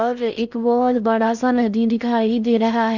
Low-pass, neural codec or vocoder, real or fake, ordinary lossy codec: 7.2 kHz; codec, 16 kHz in and 24 kHz out, 0.6 kbps, FocalCodec, streaming, 4096 codes; fake; none